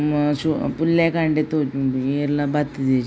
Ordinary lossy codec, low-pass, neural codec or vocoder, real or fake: none; none; none; real